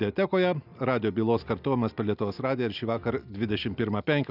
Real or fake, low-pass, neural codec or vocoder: real; 5.4 kHz; none